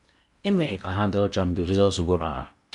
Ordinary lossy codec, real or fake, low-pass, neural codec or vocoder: MP3, 96 kbps; fake; 10.8 kHz; codec, 16 kHz in and 24 kHz out, 0.8 kbps, FocalCodec, streaming, 65536 codes